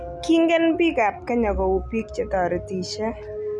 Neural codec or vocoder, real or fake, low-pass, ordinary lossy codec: none; real; none; none